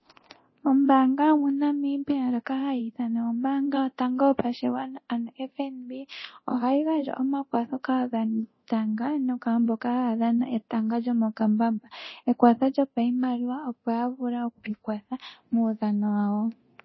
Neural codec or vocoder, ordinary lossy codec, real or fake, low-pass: codec, 24 kHz, 0.9 kbps, DualCodec; MP3, 24 kbps; fake; 7.2 kHz